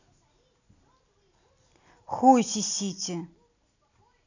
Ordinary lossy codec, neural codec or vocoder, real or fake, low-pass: none; none; real; 7.2 kHz